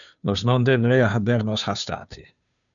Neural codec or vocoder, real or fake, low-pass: codec, 16 kHz, 2 kbps, FunCodec, trained on Chinese and English, 25 frames a second; fake; 7.2 kHz